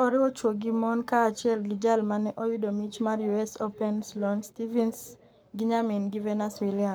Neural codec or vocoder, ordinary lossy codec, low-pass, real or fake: codec, 44.1 kHz, 7.8 kbps, Pupu-Codec; none; none; fake